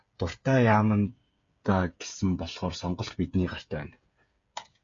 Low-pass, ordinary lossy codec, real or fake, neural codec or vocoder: 7.2 kHz; MP3, 48 kbps; fake; codec, 16 kHz, 8 kbps, FreqCodec, smaller model